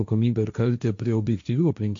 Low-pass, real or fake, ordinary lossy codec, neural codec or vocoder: 7.2 kHz; fake; AAC, 64 kbps; codec, 16 kHz, 1.1 kbps, Voila-Tokenizer